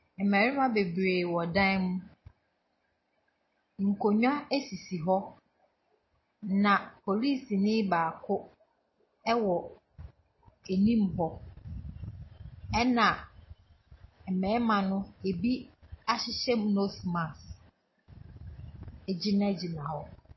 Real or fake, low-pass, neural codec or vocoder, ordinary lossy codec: real; 7.2 kHz; none; MP3, 24 kbps